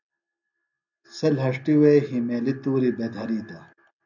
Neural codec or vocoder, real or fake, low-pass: none; real; 7.2 kHz